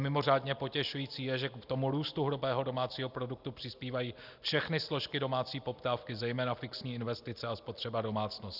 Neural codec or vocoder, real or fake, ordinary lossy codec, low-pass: none; real; Opus, 64 kbps; 5.4 kHz